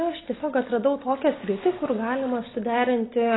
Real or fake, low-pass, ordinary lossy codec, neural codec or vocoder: real; 7.2 kHz; AAC, 16 kbps; none